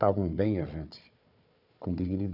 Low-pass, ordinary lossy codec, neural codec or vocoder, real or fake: 5.4 kHz; none; codec, 16 kHz, 4 kbps, FunCodec, trained on Chinese and English, 50 frames a second; fake